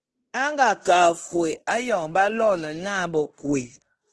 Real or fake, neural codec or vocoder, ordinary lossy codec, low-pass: fake; codec, 16 kHz in and 24 kHz out, 0.9 kbps, LongCat-Audio-Codec, fine tuned four codebook decoder; Opus, 16 kbps; 10.8 kHz